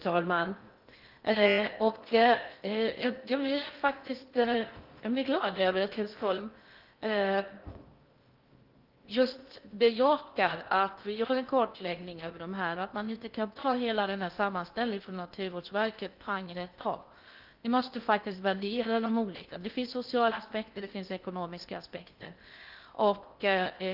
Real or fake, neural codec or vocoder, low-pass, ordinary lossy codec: fake; codec, 16 kHz in and 24 kHz out, 0.6 kbps, FocalCodec, streaming, 2048 codes; 5.4 kHz; Opus, 24 kbps